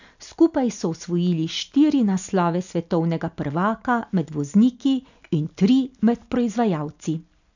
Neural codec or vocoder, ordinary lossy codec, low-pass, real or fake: none; none; 7.2 kHz; real